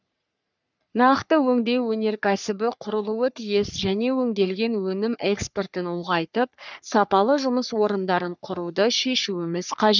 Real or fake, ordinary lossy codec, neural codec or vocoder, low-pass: fake; none; codec, 44.1 kHz, 3.4 kbps, Pupu-Codec; 7.2 kHz